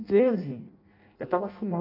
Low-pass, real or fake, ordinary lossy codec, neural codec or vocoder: 5.4 kHz; fake; AAC, 48 kbps; codec, 16 kHz in and 24 kHz out, 0.6 kbps, FireRedTTS-2 codec